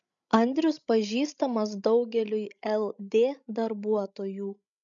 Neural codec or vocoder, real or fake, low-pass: codec, 16 kHz, 16 kbps, FreqCodec, larger model; fake; 7.2 kHz